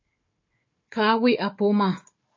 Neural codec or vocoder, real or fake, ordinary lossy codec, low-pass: codec, 16 kHz, 4 kbps, FunCodec, trained on Chinese and English, 50 frames a second; fake; MP3, 32 kbps; 7.2 kHz